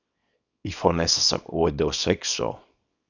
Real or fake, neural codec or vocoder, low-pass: fake; codec, 24 kHz, 0.9 kbps, WavTokenizer, small release; 7.2 kHz